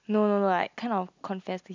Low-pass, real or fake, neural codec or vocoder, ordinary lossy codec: 7.2 kHz; real; none; MP3, 64 kbps